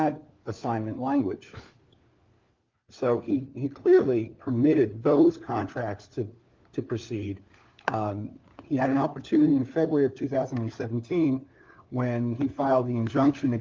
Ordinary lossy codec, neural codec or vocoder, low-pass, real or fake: Opus, 32 kbps; codec, 16 kHz, 4 kbps, FunCodec, trained on LibriTTS, 50 frames a second; 7.2 kHz; fake